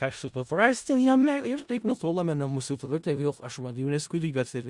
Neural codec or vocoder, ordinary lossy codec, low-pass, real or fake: codec, 16 kHz in and 24 kHz out, 0.4 kbps, LongCat-Audio-Codec, four codebook decoder; Opus, 64 kbps; 10.8 kHz; fake